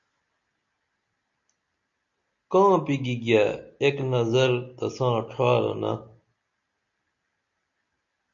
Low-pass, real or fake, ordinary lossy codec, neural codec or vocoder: 7.2 kHz; real; MP3, 64 kbps; none